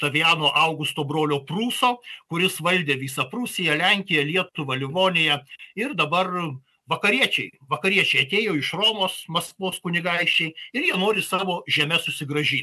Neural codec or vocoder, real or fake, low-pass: none; real; 14.4 kHz